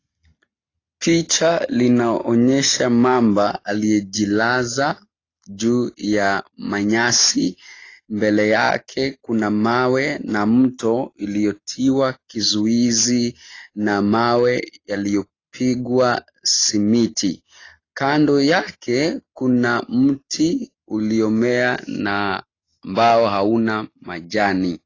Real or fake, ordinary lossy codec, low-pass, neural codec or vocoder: real; AAC, 32 kbps; 7.2 kHz; none